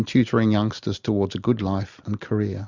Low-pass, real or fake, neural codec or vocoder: 7.2 kHz; real; none